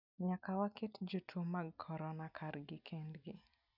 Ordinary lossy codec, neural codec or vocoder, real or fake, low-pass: none; none; real; 5.4 kHz